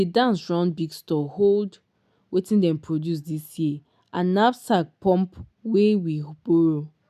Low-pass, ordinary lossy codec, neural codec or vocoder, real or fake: 14.4 kHz; none; none; real